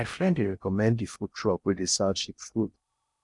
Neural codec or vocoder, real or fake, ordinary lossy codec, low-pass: codec, 16 kHz in and 24 kHz out, 0.6 kbps, FocalCodec, streaming, 4096 codes; fake; none; 10.8 kHz